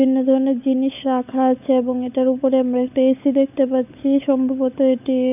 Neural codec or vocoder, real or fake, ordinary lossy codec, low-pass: codec, 16 kHz, 16 kbps, FunCodec, trained on LibriTTS, 50 frames a second; fake; none; 3.6 kHz